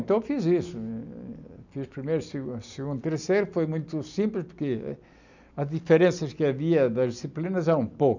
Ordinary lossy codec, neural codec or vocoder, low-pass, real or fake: none; none; 7.2 kHz; real